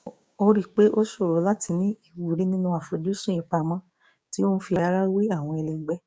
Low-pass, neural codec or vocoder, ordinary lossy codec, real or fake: none; codec, 16 kHz, 6 kbps, DAC; none; fake